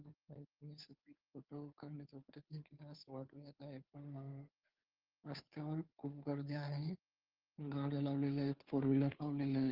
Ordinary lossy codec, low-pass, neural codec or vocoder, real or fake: Opus, 24 kbps; 5.4 kHz; codec, 16 kHz, 2 kbps, FunCodec, trained on Chinese and English, 25 frames a second; fake